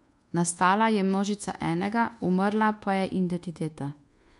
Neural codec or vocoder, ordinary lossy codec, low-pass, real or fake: codec, 24 kHz, 1.2 kbps, DualCodec; MP3, 64 kbps; 10.8 kHz; fake